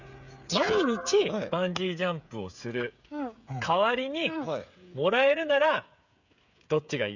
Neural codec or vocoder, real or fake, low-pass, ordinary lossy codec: codec, 16 kHz, 8 kbps, FreqCodec, smaller model; fake; 7.2 kHz; none